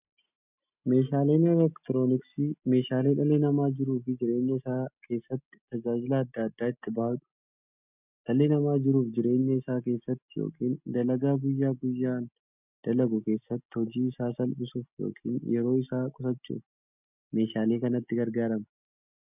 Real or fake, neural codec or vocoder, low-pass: real; none; 3.6 kHz